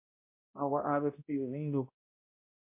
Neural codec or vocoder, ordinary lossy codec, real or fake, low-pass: codec, 16 kHz, 0.5 kbps, X-Codec, HuBERT features, trained on balanced general audio; MP3, 24 kbps; fake; 3.6 kHz